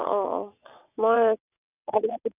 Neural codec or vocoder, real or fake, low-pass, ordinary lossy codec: vocoder, 22.05 kHz, 80 mel bands, WaveNeXt; fake; 3.6 kHz; none